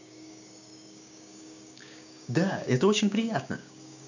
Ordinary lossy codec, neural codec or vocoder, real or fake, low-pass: none; codec, 16 kHz, 6 kbps, DAC; fake; 7.2 kHz